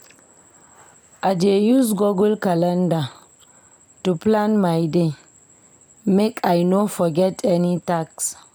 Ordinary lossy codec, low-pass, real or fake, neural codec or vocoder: none; none; real; none